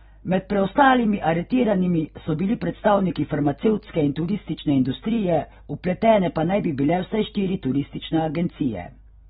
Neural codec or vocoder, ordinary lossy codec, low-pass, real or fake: none; AAC, 16 kbps; 10.8 kHz; real